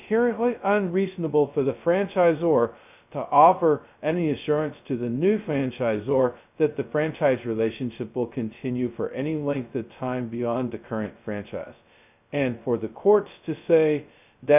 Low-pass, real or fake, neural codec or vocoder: 3.6 kHz; fake; codec, 16 kHz, 0.2 kbps, FocalCodec